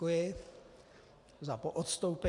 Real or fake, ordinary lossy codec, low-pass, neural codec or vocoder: real; AAC, 48 kbps; 10.8 kHz; none